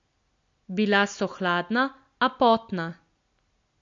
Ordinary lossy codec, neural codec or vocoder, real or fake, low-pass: MP3, 48 kbps; none; real; 7.2 kHz